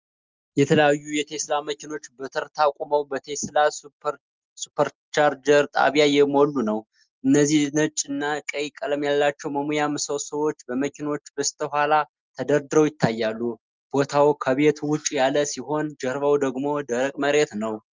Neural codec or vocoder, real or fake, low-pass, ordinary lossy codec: none; real; 7.2 kHz; Opus, 32 kbps